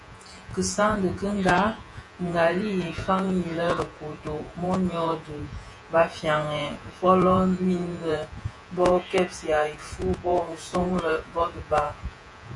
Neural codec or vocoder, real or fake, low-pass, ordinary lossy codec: vocoder, 48 kHz, 128 mel bands, Vocos; fake; 10.8 kHz; AAC, 48 kbps